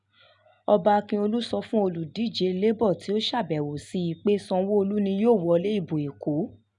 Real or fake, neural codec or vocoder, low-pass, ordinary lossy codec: real; none; 10.8 kHz; none